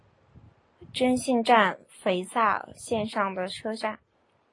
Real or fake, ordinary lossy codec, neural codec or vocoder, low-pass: real; AAC, 32 kbps; none; 10.8 kHz